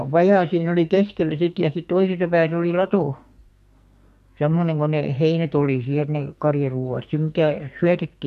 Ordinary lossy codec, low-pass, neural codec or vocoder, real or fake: MP3, 64 kbps; 14.4 kHz; codec, 44.1 kHz, 2.6 kbps, SNAC; fake